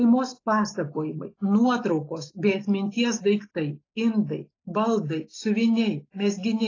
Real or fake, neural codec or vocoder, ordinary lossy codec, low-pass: real; none; AAC, 32 kbps; 7.2 kHz